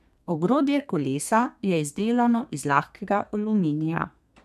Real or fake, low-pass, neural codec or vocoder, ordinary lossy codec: fake; 14.4 kHz; codec, 32 kHz, 1.9 kbps, SNAC; none